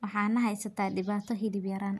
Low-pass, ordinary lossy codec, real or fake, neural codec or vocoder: 14.4 kHz; none; real; none